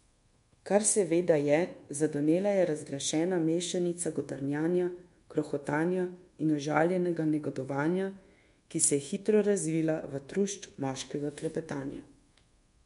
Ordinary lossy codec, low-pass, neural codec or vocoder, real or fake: MP3, 64 kbps; 10.8 kHz; codec, 24 kHz, 1.2 kbps, DualCodec; fake